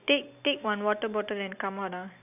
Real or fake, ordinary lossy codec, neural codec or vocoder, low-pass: real; none; none; 3.6 kHz